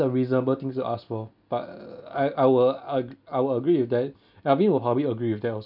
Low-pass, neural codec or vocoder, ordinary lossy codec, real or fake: 5.4 kHz; none; none; real